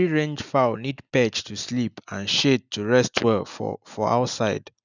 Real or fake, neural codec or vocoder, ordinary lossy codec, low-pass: real; none; none; 7.2 kHz